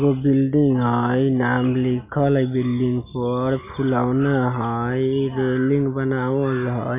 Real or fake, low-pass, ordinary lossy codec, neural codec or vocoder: real; 3.6 kHz; MP3, 24 kbps; none